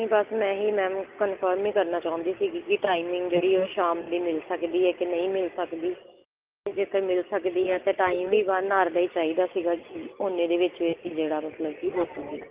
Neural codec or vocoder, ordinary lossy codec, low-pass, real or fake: none; Opus, 24 kbps; 3.6 kHz; real